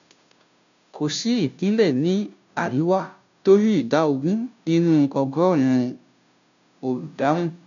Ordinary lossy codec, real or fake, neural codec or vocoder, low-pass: none; fake; codec, 16 kHz, 0.5 kbps, FunCodec, trained on Chinese and English, 25 frames a second; 7.2 kHz